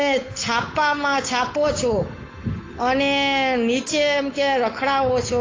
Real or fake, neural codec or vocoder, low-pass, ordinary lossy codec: fake; codec, 16 kHz, 8 kbps, FunCodec, trained on Chinese and English, 25 frames a second; 7.2 kHz; AAC, 32 kbps